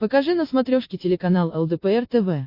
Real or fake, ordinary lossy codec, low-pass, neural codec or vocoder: real; MP3, 32 kbps; 5.4 kHz; none